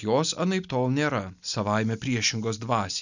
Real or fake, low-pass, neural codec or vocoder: real; 7.2 kHz; none